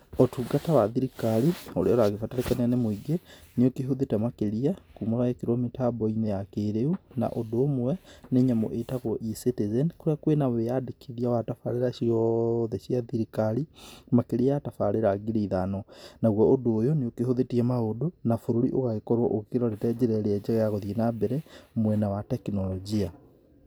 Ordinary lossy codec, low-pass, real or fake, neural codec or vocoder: none; none; real; none